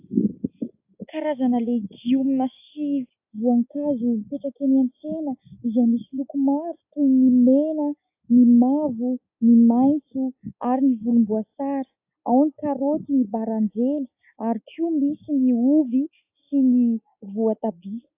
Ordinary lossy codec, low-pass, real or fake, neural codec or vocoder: AAC, 32 kbps; 3.6 kHz; real; none